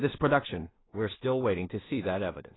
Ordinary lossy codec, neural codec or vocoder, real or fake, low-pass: AAC, 16 kbps; codec, 16 kHz in and 24 kHz out, 0.4 kbps, LongCat-Audio-Codec, two codebook decoder; fake; 7.2 kHz